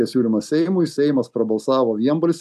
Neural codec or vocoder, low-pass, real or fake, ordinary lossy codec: none; 14.4 kHz; real; MP3, 96 kbps